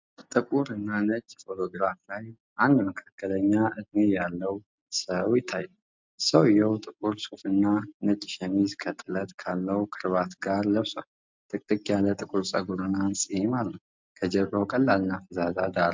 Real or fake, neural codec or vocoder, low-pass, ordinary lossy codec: real; none; 7.2 kHz; MP3, 64 kbps